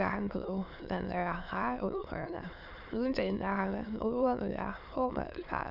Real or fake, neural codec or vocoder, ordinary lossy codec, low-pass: fake; autoencoder, 22.05 kHz, a latent of 192 numbers a frame, VITS, trained on many speakers; Opus, 64 kbps; 5.4 kHz